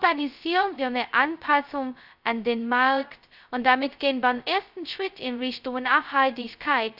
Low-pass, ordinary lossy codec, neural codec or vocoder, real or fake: 5.4 kHz; none; codec, 16 kHz, 0.2 kbps, FocalCodec; fake